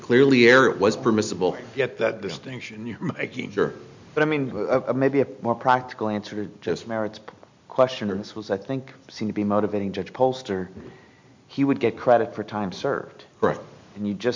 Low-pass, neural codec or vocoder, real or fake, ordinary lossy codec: 7.2 kHz; none; real; MP3, 64 kbps